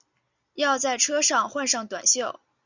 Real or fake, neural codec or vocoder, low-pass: real; none; 7.2 kHz